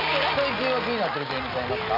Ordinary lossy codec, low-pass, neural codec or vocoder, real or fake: none; 5.4 kHz; none; real